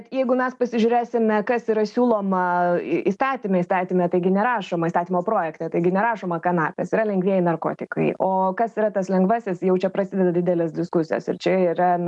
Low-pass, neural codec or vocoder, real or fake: 10.8 kHz; none; real